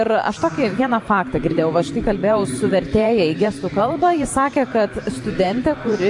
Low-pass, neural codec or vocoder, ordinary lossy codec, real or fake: 10.8 kHz; vocoder, 44.1 kHz, 128 mel bands every 512 samples, BigVGAN v2; AAC, 64 kbps; fake